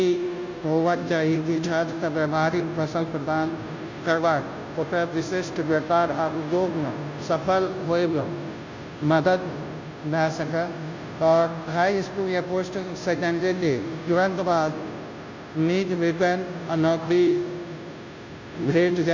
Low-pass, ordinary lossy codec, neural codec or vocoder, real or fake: 7.2 kHz; MP3, 48 kbps; codec, 16 kHz, 0.5 kbps, FunCodec, trained on Chinese and English, 25 frames a second; fake